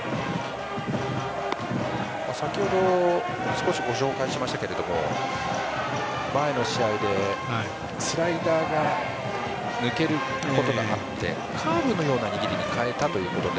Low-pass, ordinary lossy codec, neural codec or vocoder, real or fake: none; none; none; real